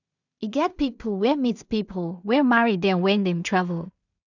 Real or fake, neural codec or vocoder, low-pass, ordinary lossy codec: fake; codec, 16 kHz in and 24 kHz out, 0.4 kbps, LongCat-Audio-Codec, two codebook decoder; 7.2 kHz; none